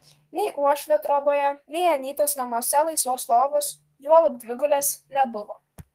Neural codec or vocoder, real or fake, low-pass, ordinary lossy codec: codec, 32 kHz, 1.9 kbps, SNAC; fake; 14.4 kHz; Opus, 24 kbps